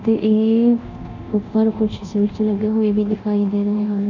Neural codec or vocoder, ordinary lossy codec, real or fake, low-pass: codec, 24 kHz, 0.9 kbps, DualCodec; none; fake; 7.2 kHz